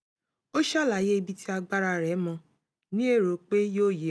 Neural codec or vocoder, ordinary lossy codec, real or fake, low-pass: none; none; real; none